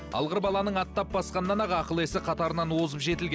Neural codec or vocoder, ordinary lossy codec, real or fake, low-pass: none; none; real; none